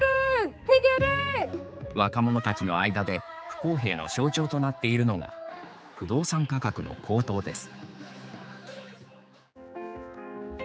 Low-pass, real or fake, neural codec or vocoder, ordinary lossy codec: none; fake; codec, 16 kHz, 4 kbps, X-Codec, HuBERT features, trained on general audio; none